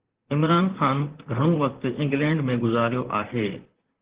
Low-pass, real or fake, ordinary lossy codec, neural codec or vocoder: 3.6 kHz; fake; Opus, 16 kbps; vocoder, 44.1 kHz, 128 mel bands, Pupu-Vocoder